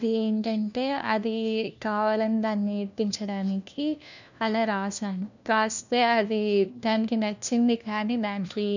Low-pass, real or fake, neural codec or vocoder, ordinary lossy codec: 7.2 kHz; fake; codec, 16 kHz, 1 kbps, FunCodec, trained on LibriTTS, 50 frames a second; none